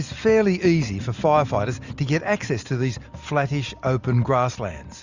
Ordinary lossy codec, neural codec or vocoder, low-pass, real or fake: Opus, 64 kbps; none; 7.2 kHz; real